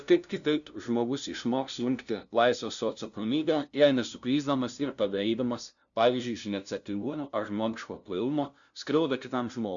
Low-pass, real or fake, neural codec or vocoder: 7.2 kHz; fake; codec, 16 kHz, 0.5 kbps, FunCodec, trained on LibriTTS, 25 frames a second